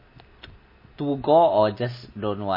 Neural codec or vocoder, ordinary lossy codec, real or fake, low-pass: none; MP3, 24 kbps; real; 5.4 kHz